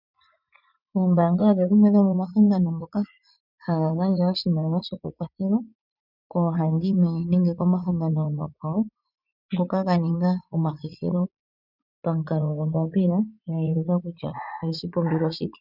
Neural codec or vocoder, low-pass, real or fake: vocoder, 44.1 kHz, 80 mel bands, Vocos; 5.4 kHz; fake